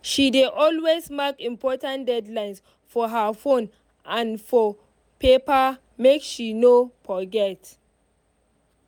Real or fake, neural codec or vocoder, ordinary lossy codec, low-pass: real; none; none; 19.8 kHz